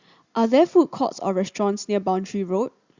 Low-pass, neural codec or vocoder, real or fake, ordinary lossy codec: 7.2 kHz; none; real; Opus, 64 kbps